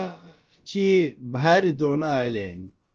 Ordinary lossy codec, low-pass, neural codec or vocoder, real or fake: Opus, 24 kbps; 7.2 kHz; codec, 16 kHz, about 1 kbps, DyCAST, with the encoder's durations; fake